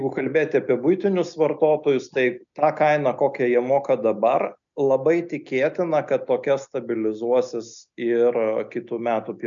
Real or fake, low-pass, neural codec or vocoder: real; 7.2 kHz; none